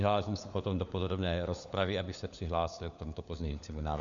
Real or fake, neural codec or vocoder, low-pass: fake; codec, 16 kHz, 4 kbps, FunCodec, trained on LibriTTS, 50 frames a second; 7.2 kHz